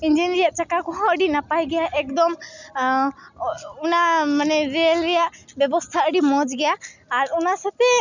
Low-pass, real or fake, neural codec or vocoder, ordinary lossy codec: 7.2 kHz; real; none; none